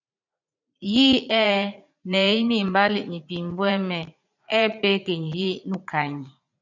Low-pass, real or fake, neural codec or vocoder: 7.2 kHz; fake; vocoder, 44.1 kHz, 80 mel bands, Vocos